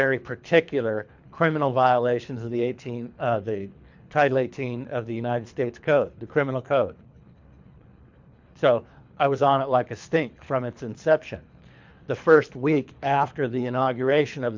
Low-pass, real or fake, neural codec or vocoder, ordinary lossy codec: 7.2 kHz; fake; codec, 24 kHz, 3 kbps, HILCodec; MP3, 64 kbps